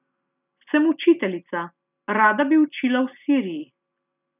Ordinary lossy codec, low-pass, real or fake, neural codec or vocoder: none; 3.6 kHz; real; none